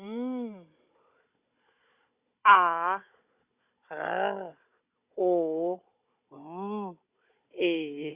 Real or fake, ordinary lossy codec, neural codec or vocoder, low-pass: fake; Opus, 64 kbps; codec, 16 kHz, 16 kbps, FunCodec, trained on Chinese and English, 50 frames a second; 3.6 kHz